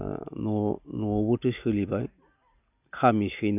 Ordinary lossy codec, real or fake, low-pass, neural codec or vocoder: none; real; 3.6 kHz; none